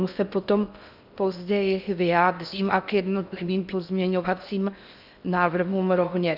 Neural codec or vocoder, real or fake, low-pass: codec, 16 kHz in and 24 kHz out, 0.6 kbps, FocalCodec, streaming, 4096 codes; fake; 5.4 kHz